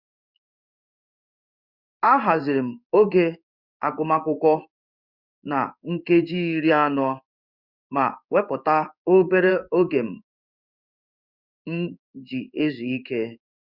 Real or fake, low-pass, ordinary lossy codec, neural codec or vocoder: fake; 5.4 kHz; Opus, 64 kbps; codec, 16 kHz in and 24 kHz out, 1 kbps, XY-Tokenizer